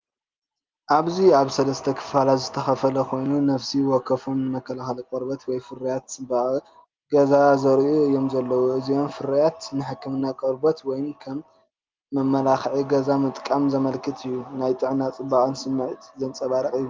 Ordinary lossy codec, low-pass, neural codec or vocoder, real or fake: Opus, 32 kbps; 7.2 kHz; none; real